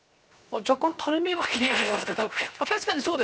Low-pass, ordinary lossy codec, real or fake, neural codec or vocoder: none; none; fake; codec, 16 kHz, 0.7 kbps, FocalCodec